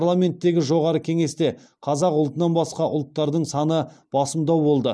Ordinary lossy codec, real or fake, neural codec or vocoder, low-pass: none; real; none; none